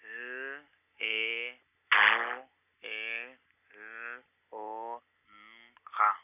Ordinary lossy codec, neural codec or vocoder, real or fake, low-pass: none; none; real; 3.6 kHz